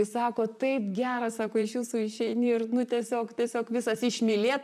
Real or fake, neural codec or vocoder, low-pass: fake; vocoder, 44.1 kHz, 128 mel bands, Pupu-Vocoder; 14.4 kHz